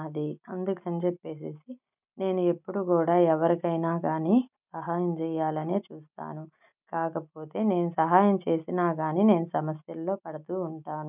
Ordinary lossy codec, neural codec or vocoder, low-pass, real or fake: none; none; 3.6 kHz; real